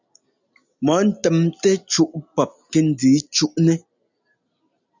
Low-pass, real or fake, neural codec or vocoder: 7.2 kHz; real; none